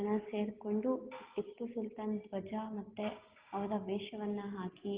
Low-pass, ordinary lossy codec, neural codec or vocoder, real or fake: 3.6 kHz; Opus, 16 kbps; none; real